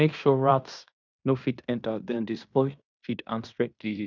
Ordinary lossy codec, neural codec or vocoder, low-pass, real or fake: none; codec, 16 kHz in and 24 kHz out, 0.9 kbps, LongCat-Audio-Codec, fine tuned four codebook decoder; 7.2 kHz; fake